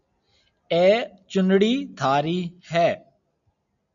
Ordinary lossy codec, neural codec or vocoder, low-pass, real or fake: MP3, 96 kbps; none; 7.2 kHz; real